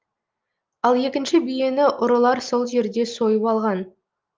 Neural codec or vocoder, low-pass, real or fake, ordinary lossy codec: none; 7.2 kHz; real; Opus, 32 kbps